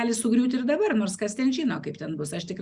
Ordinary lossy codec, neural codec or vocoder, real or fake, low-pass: Opus, 64 kbps; none; real; 10.8 kHz